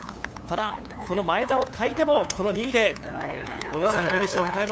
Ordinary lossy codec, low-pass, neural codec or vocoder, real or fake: none; none; codec, 16 kHz, 2 kbps, FunCodec, trained on LibriTTS, 25 frames a second; fake